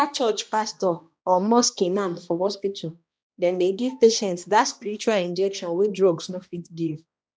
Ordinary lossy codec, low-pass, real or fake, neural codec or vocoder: none; none; fake; codec, 16 kHz, 1 kbps, X-Codec, HuBERT features, trained on balanced general audio